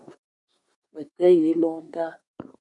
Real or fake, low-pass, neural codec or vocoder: fake; 10.8 kHz; codec, 24 kHz, 1 kbps, SNAC